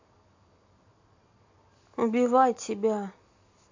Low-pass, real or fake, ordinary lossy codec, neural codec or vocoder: 7.2 kHz; real; AAC, 48 kbps; none